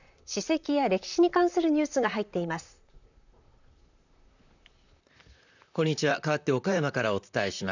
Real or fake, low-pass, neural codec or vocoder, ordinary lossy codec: fake; 7.2 kHz; vocoder, 44.1 kHz, 128 mel bands, Pupu-Vocoder; none